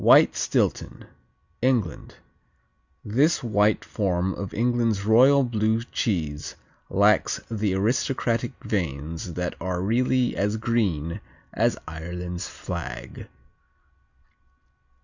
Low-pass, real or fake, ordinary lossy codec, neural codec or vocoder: 7.2 kHz; real; Opus, 64 kbps; none